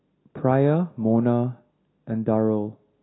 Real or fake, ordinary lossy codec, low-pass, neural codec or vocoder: real; AAC, 16 kbps; 7.2 kHz; none